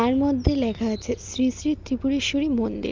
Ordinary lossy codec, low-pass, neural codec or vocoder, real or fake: Opus, 32 kbps; 7.2 kHz; none; real